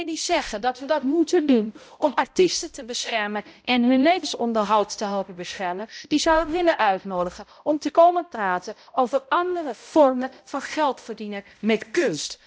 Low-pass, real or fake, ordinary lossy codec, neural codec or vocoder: none; fake; none; codec, 16 kHz, 0.5 kbps, X-Codec, HuBERT features, trained on balanced general audio